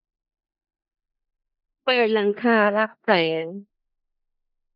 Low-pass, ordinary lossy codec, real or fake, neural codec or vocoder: 5.4 kHz; none; fake; codec, 16 kHz in and 24 kHz out, 0.4 kbps, LongCat-Audio-Codec, four codebook decoder